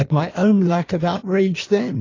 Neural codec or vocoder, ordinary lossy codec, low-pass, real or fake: codec, 16 kHz in and 24 kHz out, 1.1 kbps, FireRedTTS-2 codec; AAC, 32 kbps; 7.2 kHz; fake